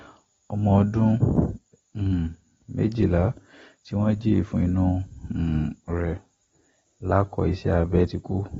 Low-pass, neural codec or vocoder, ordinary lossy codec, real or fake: 7.2 kHz; none; AAC, 24 kbps; real